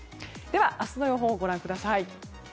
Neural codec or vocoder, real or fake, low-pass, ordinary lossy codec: none; real; none; none